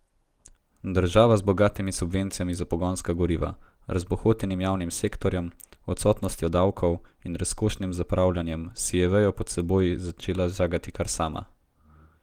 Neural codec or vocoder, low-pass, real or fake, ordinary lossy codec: none; 19.8 kHz; real; Opus, 24 kbps